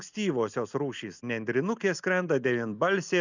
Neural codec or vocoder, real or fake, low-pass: none; real; 7.2 kHz